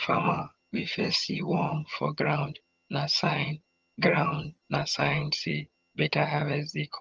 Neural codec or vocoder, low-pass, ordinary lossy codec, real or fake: vocoder, 22.05 kHz, 80 mel bands, HiFi-GAN; 7.2 kHz; Opus, 24 kbps; fake